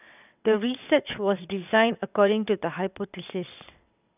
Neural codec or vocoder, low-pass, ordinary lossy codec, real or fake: vocoder, 22.05 kHz, 80 mel bands, WaveNeXt; 3.6 kHz; none; fake